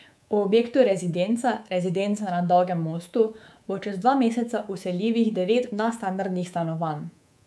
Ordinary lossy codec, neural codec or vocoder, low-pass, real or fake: none; codec, 24 kHz, 3.1 kbps, DualCodec; none; fake